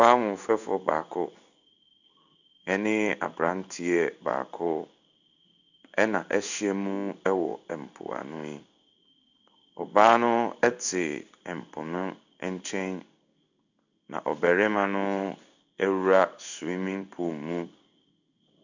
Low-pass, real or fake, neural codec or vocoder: 7.2 kHz; fake; codec, 16 kHz in and 24 kHz out, 1 kbps, XY-Tokenizer